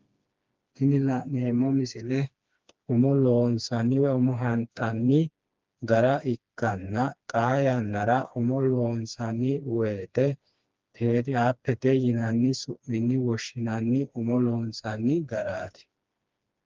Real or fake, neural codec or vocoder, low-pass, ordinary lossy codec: fake; codec, 16 kHz, 2 kbps, FreqCodec, smaller model; 7.2 kHz; Opus, 32 kbps